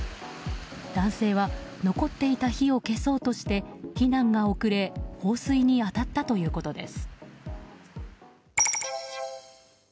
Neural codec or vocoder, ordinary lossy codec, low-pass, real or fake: none; none; none; real